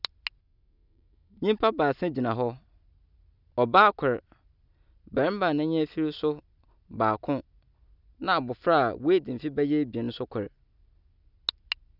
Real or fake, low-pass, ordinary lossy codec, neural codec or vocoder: real; 5.4 kHz; none; none